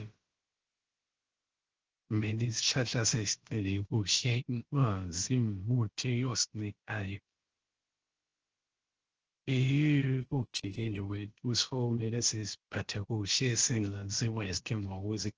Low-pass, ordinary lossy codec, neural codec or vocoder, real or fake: 7.2 kHz; Opus, 16 kbps; codec, 16 kHz, about 1 kbps, DyCAST, with the encoder's durations; fake